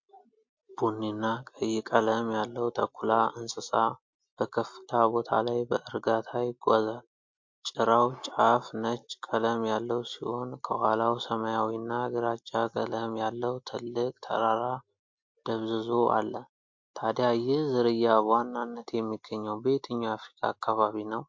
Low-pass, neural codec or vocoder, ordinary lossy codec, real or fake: 7.2 kHz; none; MP3, 48 kbps; real